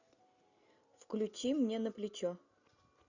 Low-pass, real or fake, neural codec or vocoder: 7.2 kHz; real; none